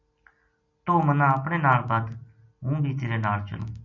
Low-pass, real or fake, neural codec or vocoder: 7.2 kHz; real; none